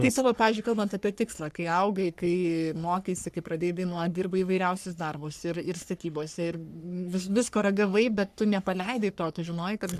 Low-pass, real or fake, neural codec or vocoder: 14.4 kHz; fake; codec, 44.1 kHz, 3.4 kbps, Pupu-Codec